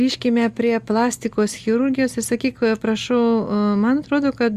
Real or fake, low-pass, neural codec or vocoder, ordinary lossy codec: real; 14.4 kHz; none; Opus, 64 kbps